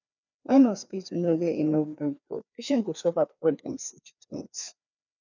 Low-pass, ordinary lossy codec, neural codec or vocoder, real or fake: 7.2 kHz; none; codec, 16 kHz, 2 kbps, FreqCodec, larger model; fake